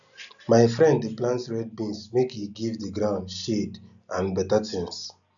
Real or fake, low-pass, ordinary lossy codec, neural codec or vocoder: real; 7.2 kHz; none; none